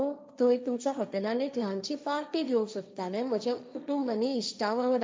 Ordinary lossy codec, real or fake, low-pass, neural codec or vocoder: none; fake; none; codec, 16 kHz, 1.1 kbps, Voila-Tokenizer